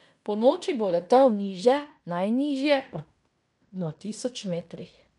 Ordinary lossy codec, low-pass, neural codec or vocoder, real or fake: none; 10.8 kHz; codec, 16 kHz in and 24 kHz out, 0.9 kbps, LongCat-Audio-Codec, fine tuned four codebook decoder; fake